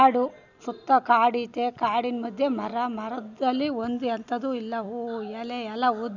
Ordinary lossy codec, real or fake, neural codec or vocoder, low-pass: none; real; none; 7.2 kHz